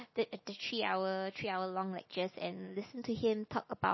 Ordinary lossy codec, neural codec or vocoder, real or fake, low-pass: MP3, 24 kbps; none; real; 7.2 kHz